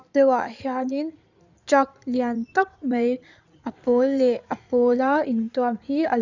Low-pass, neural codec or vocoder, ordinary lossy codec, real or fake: 7.2 kHz; codec, 16 kHz in and 24 kHz out, 2.2 kbps, FireRedTTS-2 codec; none; fake